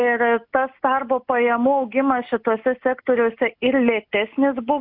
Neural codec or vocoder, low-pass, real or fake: none; 5.4 kHz; real